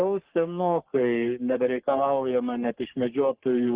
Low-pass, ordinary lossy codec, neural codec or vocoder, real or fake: 3.6 kHz; Opus, 16 kbps; codec, 44.1 kHz, 3.4 kbps, Pupu-Codec; fake